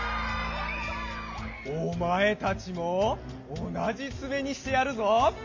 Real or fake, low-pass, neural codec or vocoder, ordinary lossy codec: real; 7.2 kHz; none; MP3, 48 kbps